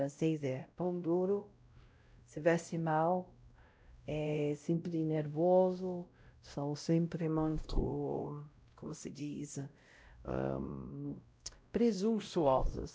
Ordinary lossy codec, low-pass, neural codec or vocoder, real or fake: none; none; codec, 16 kHz, 0.5 kbps, X-Codec, WavLM features, trained on Multilingual LibriSpeech; fake